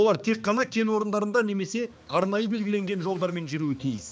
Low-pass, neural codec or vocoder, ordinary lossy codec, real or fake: none; codec, 16 kHz, 2 kbps, X-Codec, HuBERT features, trained on balanced general audio; none; fake